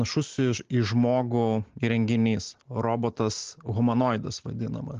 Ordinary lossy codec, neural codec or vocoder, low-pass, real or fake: Opus, 16 kbps; none; 7.2 kHz; real